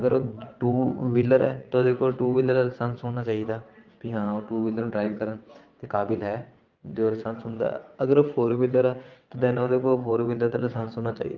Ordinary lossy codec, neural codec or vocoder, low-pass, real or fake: Opus, 32 kbps; vocoder, 22.05 kHz, 80 mel bands, WaveNeXt; 7.2 kHz; fake